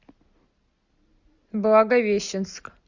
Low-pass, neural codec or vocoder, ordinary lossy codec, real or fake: 7.2 kHz; none; Opus, 64 kbps; real